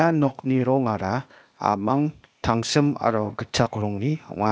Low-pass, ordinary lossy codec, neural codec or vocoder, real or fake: none; none; codec, 16 kHz, 0.8 kbps, ZipCodec; fake